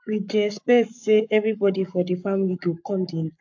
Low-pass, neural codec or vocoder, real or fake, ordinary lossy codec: 7.2 kHz; codec, 44.1 kHz, 7.8 kbps, Pupu-Codec; fake; MP3, 48 kbps